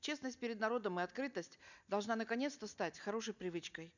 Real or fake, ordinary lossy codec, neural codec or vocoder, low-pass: real; none; none; 7.2 kHz